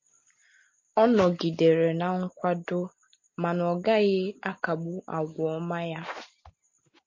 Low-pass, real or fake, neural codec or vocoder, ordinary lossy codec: 7.2 kHz; real; none; MP3, 32 kbps